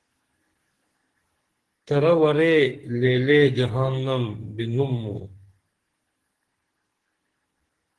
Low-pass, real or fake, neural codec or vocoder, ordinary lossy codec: 10.8 kHz; fake; codec, 44.1 kHz, 2.6 kbps, SNAC; Opus, 16 kbps